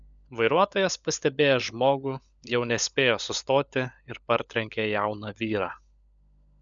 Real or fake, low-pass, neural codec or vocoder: fake; 7.2 kHz; codec, 16 kHz, 16 kbps, FunCodec, trained on LibriTTS, 50 frames a second